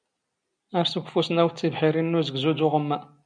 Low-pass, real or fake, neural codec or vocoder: 9.9 kHz; real; none